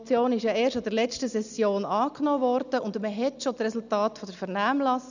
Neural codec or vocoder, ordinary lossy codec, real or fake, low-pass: none; none; real; 7.2 kHz